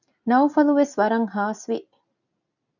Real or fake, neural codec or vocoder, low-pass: real; none; 7.2 kHz